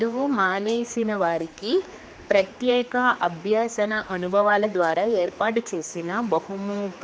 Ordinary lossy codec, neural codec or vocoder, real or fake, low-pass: none; codec, 16 kHz, 2 kbps, X-Codec, HuBERT features, trained on general audio; fake; none